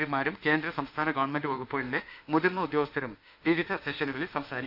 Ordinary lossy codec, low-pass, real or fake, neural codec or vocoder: none; 5.4 kHz; fake; autoencoder, 48 kHz, 32 numbers a frame, DAC-VAE, trained on Japanese speech